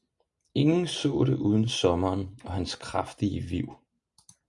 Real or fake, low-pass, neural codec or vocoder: real; 10.8 kHz; none